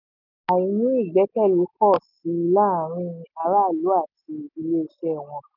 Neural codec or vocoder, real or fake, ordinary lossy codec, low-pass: none; real; none; 5.4 kHz